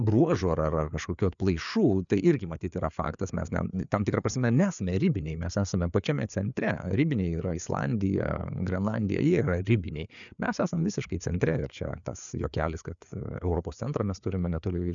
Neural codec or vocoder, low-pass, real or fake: codec, 16 kHz, 4 kbps, FreqCodec, larger model; 7.2 kHz; fake